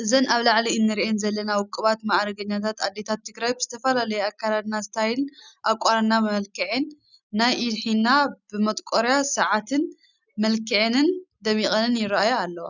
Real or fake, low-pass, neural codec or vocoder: real; 7.2 kHz; none